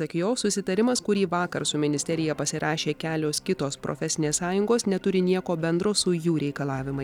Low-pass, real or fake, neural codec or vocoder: 19.8 kHz; real; none